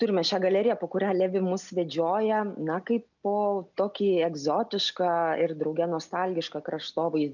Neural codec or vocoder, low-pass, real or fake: none; 7.2 kHz; real